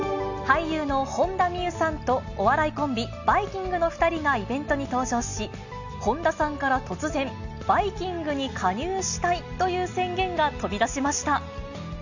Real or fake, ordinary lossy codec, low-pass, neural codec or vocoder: real; none; 7.2 kHz; none